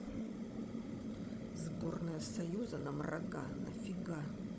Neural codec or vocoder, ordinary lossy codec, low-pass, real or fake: codec, 16 kHz, 16 kbps, FunCodec, trained on Chinese and English, 50 frames a second; none; none; fake